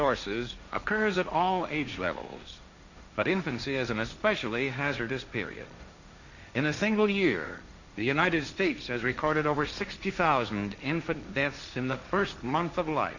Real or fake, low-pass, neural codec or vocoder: fake; 7.2 kHz; codec, 16 kHz, 1.1 kbps, Voila-Tokenizer